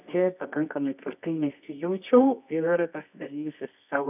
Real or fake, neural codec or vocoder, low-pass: fake; codec, 24 kHz, 0.9 kbps, WavTokenizer, medium music audio release; 3.6 kHz